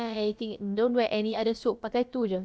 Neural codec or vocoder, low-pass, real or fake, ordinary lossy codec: codec, 16 kHz, about 1 kbps, DyCAST, with the encoder's durations; none; fake; none